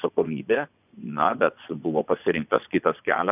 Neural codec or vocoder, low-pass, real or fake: vocoder, 24 kHz, 100 mel bands, Vocos; 3.6 kHz; fake